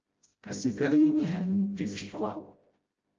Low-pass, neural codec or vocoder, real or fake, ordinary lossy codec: 7.2 kHz; codec, 16 kHz, 0.5 kbps, FreqCodec, smaller model; fake; Opus, 24 kbps